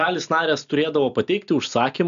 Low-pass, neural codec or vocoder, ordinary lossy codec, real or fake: 7.2 kHz; none; MP3, 96 kbps; real